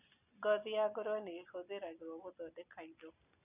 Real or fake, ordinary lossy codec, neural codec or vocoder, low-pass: real; none; none; 3.6 kHz